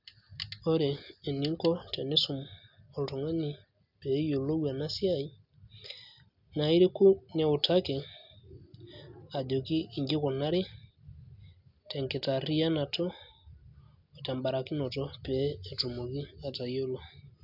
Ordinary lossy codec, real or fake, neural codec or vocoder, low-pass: none; real; none; 5.4 kHz